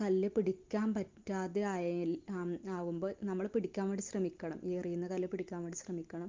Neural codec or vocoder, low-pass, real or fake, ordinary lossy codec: none; 7.2 kHz; real; Opus, 32 kbps